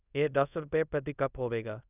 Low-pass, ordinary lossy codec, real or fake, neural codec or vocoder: 3.6 kHz; none; fake; codec, 24 kHz, 0.5 kbps, DualCodec